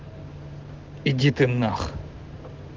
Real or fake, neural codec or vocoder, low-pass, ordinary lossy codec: real; none; 7.2 kHz; Opus, 16 kbps